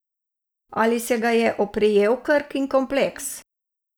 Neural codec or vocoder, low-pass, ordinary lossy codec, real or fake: none; none; none; real